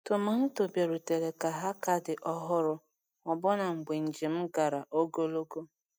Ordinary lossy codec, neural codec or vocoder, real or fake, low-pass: none; none; real; none